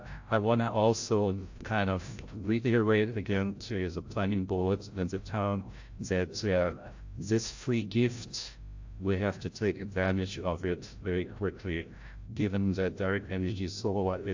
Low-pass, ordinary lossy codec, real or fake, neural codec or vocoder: 7.2 kHz; AAC, 48 kbps; fake; codec, 16 kHz, 0.5 kbps, FreqCodec, larger model